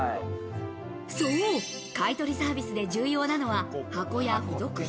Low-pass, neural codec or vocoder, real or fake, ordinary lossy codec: none; none; real; none